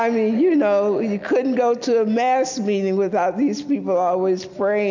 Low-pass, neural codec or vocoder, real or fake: 7.2 kHz; none; real